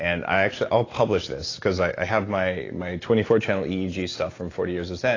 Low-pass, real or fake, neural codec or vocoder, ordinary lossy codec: 7.2 kHz; real; none; AAC, 32 kbps